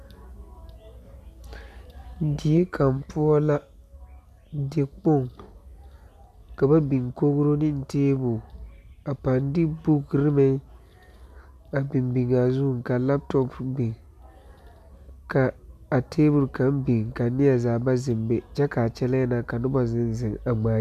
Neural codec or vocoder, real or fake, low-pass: none; real; 14.4 kHz